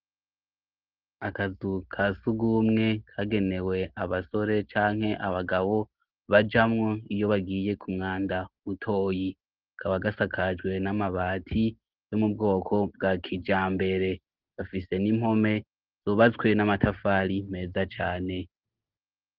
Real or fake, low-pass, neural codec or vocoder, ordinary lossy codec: real; 5.4 kHz; none; Opus, 16 kbps